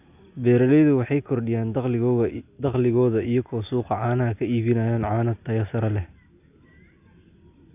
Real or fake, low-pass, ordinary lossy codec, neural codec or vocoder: real; 3.6 kHz; none; none